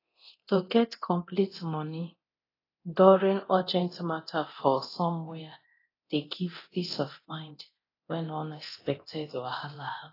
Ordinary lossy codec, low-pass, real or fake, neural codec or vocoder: AAC, 24 kbps; 5.4 kHz; fake; codec, 24 kHz, 0.9 kbps, DualCodec